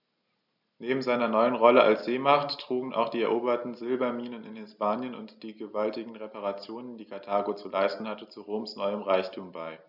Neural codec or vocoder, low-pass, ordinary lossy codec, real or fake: none; 5.4 kHz; none; real